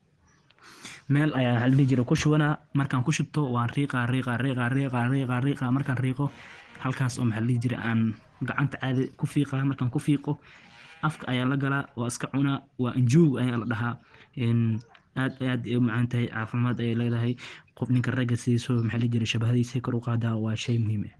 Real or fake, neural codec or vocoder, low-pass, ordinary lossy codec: real; none; 9.9 kHz; Opus, 16 kbps